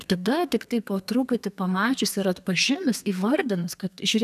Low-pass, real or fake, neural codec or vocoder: 14.4 kHz; fake; codec, 32 kHz, 1.9 kbps, SNAC